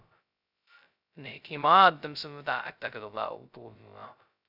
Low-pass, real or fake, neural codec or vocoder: 5.4 kHz; fake; codec, 16 kHz, 0.2 kbps, FocalCodec